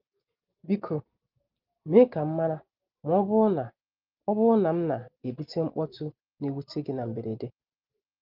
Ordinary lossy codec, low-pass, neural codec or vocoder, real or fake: Opus, 32 kbps; 5.4 kHz; none; real